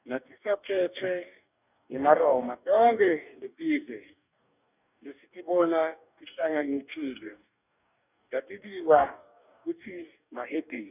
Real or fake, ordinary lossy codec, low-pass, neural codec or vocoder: fake; none; 3.6 kHz; codec, 44.1 kHz, 2.6 kbps, DAC